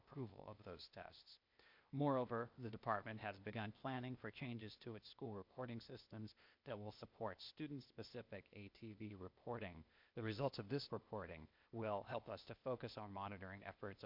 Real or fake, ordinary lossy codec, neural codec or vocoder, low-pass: fake; MP3, 48 kbps; codec, 16 kHz, 0.8 kbps, ZipCodec; 5.4 kHz